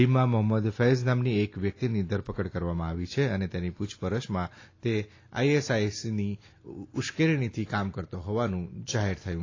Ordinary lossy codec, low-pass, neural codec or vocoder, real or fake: AAC, 32 kbps; 7.2 kHz; none; real